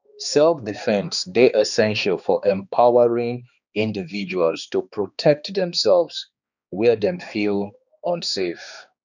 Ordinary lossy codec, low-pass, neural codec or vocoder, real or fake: none; 7.2 kHz; codec, 16 kHz, 2 kbps, X-Codec, HuBERT features, trained on balanced general audio; fake